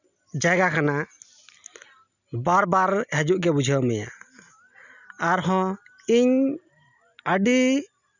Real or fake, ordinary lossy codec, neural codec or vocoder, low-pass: real; none; none; 7.2 kHz